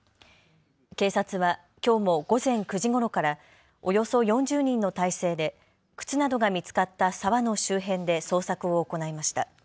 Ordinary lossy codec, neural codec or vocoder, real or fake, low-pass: none; none; real; none